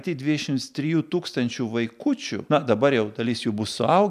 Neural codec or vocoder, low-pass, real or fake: none; 14.4 kHz; real